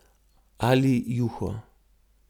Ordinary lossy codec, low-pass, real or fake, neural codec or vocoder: none; 19.8 kHz; real; none